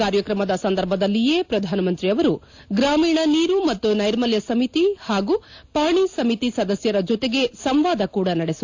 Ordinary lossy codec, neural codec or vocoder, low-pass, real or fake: AAC, 48 kbps; none; 7.2 kHz; real